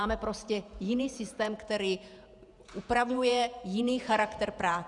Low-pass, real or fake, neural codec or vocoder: 10.8 kHz; fake; vocoder, 48 kHz, 128 mel bands, Vocos